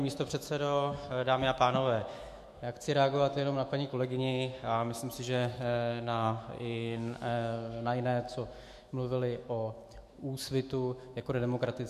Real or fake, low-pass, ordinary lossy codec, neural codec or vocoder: fake; 14.4 kHz; MP3, 64 kbps; autoencoder, 48 kHz, 128 numbers a frame, DAC-VAE, trained on Japanese speech